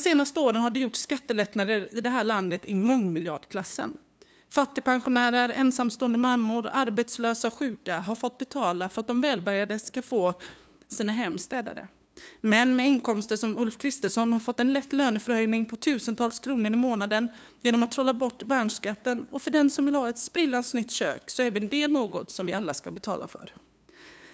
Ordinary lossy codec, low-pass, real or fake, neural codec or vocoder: none; none; fake; codec, 16 kHz, 2 kbps, FunCodec, trained on LibriTTS, 25 frames a second